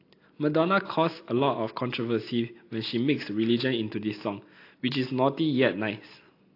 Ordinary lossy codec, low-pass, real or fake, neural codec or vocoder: AAC, 32 kbps; 5.4 kHz; real; none